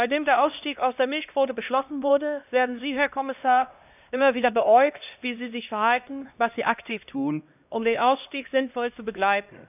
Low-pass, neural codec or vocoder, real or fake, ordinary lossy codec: 3.6 kHz; codec, 16 kHz, 1 kbps, X-Codec, HuBERT features, trained on LibriSpeech; fake; none